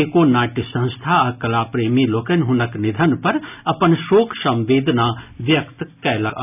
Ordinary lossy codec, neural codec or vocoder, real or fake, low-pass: none; none; real; 3.6 kHz